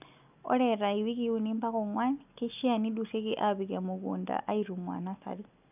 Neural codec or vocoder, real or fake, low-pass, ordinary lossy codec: none; real; 3.6 kHz; none